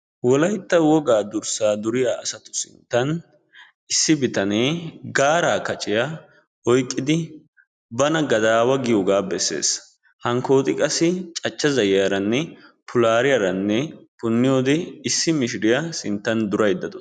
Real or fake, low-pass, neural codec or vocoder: real; 9.9 kHz; none